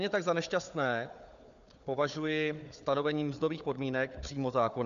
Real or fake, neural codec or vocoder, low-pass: fake; codec, 16 kHz, 4 kbps, FunCodec, trained on Chinese and English, 50 frames a second; 7.2 kHz